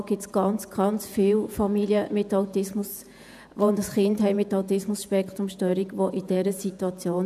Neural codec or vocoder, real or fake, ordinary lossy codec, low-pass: vocoder, 48 kHz, 128 mel bands, Vocos; fake; none; 14.4 kHz